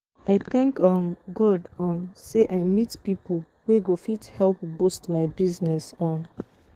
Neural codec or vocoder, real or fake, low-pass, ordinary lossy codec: codec, 32 kHz, 1.9 kbps, SNAC; fake; 14.4 kHz; Opus, 24 kbps